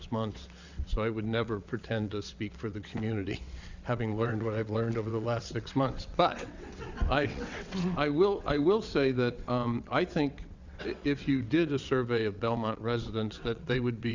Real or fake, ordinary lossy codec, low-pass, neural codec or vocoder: fake; Opus, 64 kbps; 7.2 kHz; vocoder, 22.05 kHz, 80 mel bands, WaveNeXt